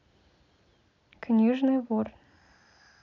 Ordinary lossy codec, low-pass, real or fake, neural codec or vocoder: none; 7.2 kHz; real; none